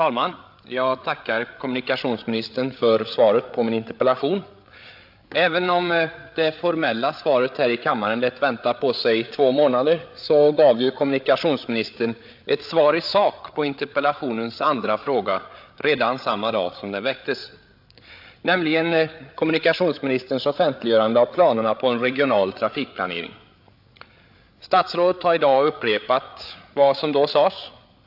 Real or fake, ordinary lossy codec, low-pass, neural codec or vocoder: fake; AAC, 48 kbps; 5.4 kHz; codec, 16 kHz, 8 kbps, FreqCodec, larger model